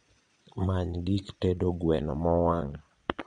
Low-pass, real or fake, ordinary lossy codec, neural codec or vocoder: 9.9 kHz; fake; MP3, 64 kbps; vocoder, 22.05 kHz, 80 mel bands, Vocos